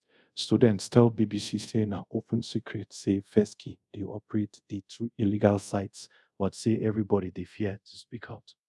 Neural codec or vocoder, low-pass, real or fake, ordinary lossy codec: codec, 24 kHz, 0.5 kbps, DualCodec; none; fake; none